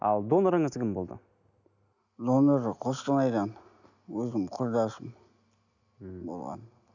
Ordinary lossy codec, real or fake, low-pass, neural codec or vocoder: none; real; 7.2 kHz; none